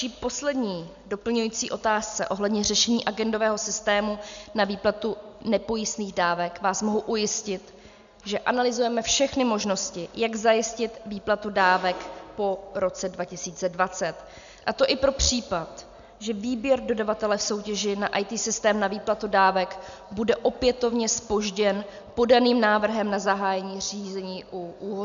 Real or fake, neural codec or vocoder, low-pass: real; none; 7.2 kHz